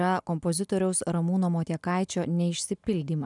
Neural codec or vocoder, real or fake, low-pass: none; real; 10.8 kHz